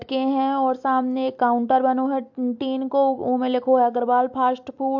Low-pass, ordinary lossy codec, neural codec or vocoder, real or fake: 7.2 kHz; MP3, 48 kbps; none; real